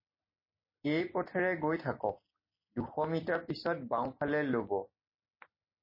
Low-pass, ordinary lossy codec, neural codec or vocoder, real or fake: 5.4 kHz; MP3, 24 kbps; none; real